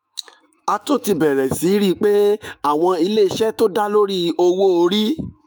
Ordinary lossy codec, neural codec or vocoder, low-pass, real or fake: none; autoencoder, 48 kHz, 128 numbers a frame, DAC-VAE, trained on Japanese speech; none; fake